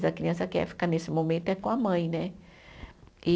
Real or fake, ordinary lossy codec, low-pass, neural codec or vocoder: real; none; none; none